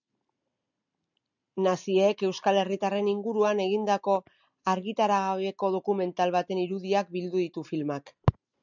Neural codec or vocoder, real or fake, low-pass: none; real; 7.2 kHz